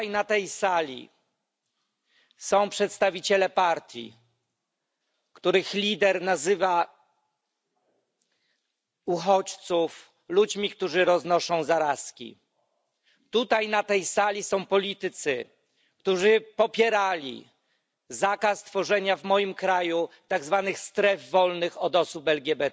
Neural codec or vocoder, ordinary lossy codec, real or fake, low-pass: none; none; real; none